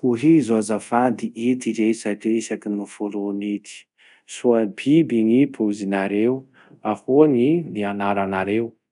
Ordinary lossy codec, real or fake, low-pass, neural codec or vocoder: none; fake; 10.8 kHz; codec, 24 kHz, 0.5 kbps, DualCodec